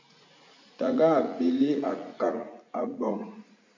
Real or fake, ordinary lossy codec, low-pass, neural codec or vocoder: fake; MP3, 48 kbps; 7.2 kHz; vocoder, 44.1 kHz, 80 mel bands, Vocos